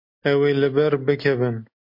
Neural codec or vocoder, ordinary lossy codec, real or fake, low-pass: none; MP3, 32 kbps; real; 5.4 kHz